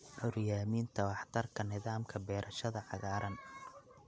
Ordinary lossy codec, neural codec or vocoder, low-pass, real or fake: none; none; none; real